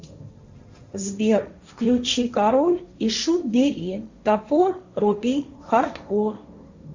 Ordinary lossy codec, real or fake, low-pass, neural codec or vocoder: Opus, 64 kbps; fake; 7.2 kHz; codec, 16 kHz, 1.1 kbps, Voila-Tokenizer